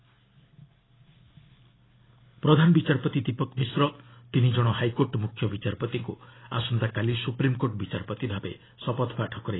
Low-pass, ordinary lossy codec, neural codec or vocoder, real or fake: 7.2 kHz; AAC, 16 kbps; none; real